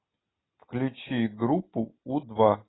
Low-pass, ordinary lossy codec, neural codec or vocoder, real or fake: 7.2 kHz; AAC, 16 kbps; none; real